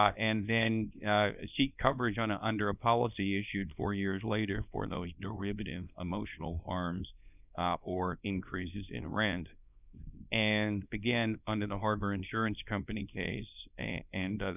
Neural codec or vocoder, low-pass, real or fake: codec, 24 kHz, 0.9 kbps, WavTokenizer, small release; 3.6 kHz; fake